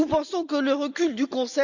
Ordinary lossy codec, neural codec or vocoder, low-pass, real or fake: none; none; 7.2 kHz; real